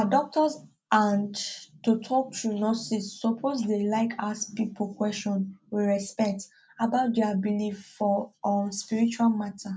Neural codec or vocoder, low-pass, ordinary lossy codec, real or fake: none; none; none; real